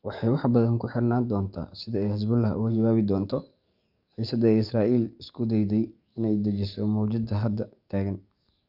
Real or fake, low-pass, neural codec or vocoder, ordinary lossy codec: fake; 5.4 kHz; codec, 44.1 kHz, 7.8 kbps, DAC; none